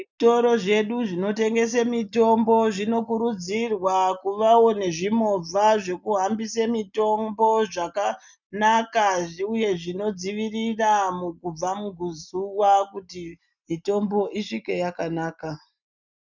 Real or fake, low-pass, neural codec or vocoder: real; 7.2 kHz; none